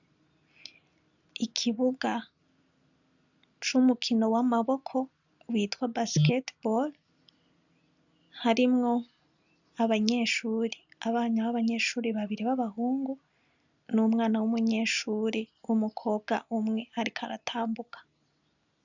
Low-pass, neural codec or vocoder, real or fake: 7.2 kHz; none; real